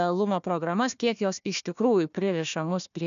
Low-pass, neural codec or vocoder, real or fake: 7.2 kHz; codec, 16 kHz, 1 kbps, FunCodec, trained on Chinese and English, 50 frames a second; fake